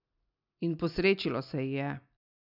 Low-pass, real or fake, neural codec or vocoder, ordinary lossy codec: 5.4 kHz; real; none; none